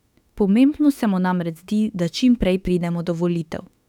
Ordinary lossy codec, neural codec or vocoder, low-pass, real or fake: none; autoencoder, 48 kHz, 32 numbers a frame, DAC-VAE, trained on Japanese speech; 19.8 kHz; fake